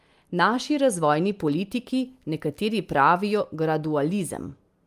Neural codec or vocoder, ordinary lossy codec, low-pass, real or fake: none; Opus, 32 kbps; 14.4 kHz; real